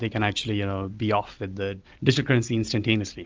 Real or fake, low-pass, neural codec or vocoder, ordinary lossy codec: real; 7.2 kHz; none; Opus, 24 kbps